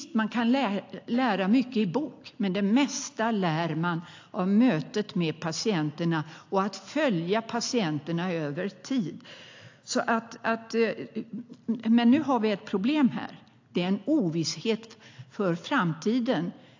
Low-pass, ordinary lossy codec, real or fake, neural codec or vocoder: 7.2 kHz; AAC, 48 kbps; real; none